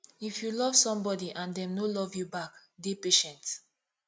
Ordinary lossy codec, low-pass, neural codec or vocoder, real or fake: none; none; none; real